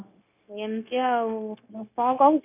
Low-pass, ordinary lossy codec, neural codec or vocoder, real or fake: 3.6 kHz; none; codec, 16 kHz, 0.9 kbps, LongCat-Audio-Codec; fake